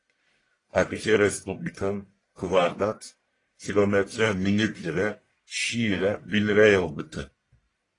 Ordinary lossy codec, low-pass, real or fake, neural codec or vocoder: AAC, 32 kbps; 10.8 kHz; fake; codec, 44.1 kHz, 1.7 kbps, Pupu-Codec